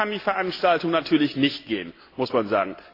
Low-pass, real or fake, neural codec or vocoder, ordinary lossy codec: 5.4 kHz; real; none; AAC, 32 kbps